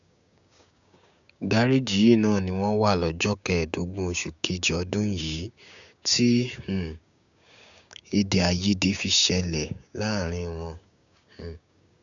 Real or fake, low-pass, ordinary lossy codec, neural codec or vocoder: fake; 7.2 kHz; MP3, 96 kbps; codec, 16 kHz, 6 kbps, DAC